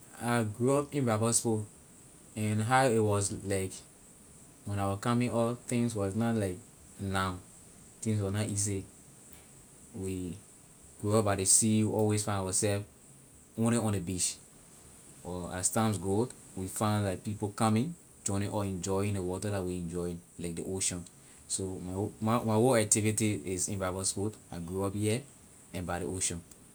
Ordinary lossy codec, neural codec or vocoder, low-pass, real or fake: none; none; none; real